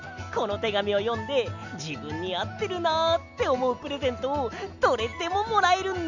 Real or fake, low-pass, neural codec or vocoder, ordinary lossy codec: real; 7.2 kHz; none; none